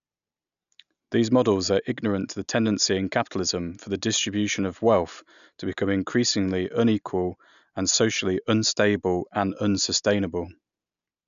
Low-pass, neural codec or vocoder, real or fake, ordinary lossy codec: 7.2 kHz; none; real; none